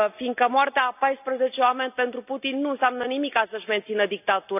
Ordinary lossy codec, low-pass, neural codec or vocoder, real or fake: none; 3.6 kHz; none; real